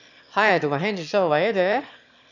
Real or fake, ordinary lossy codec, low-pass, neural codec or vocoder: fake; none; 7.2 kHz; autoencoder, 22.05 kHz, a latent of 192 numbers a frame, VITS, trained on one speaker